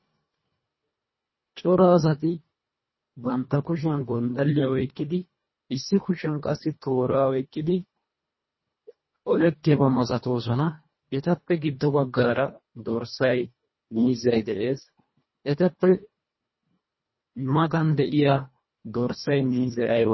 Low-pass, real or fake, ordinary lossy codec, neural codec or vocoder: 7.2 kHz; fake; MP3, 24 kbps; codec, 24 kHz, 1.5 kbps, HILCodec